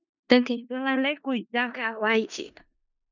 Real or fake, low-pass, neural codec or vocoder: fake; 7.2 kHz; codec, 16 kHz in and 24 kHz out, 0.4 kbps, LongCat-Audio-Codec, four codebook decoder